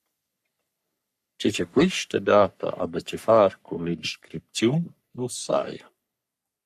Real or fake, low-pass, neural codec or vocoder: fake; 14.4 kHz; codec, 44.1 kHz, 3.4 kbps, Pupu-Codec